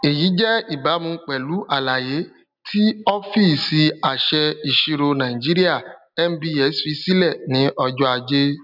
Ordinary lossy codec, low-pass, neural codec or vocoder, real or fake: none; 5.4 kHz; none; real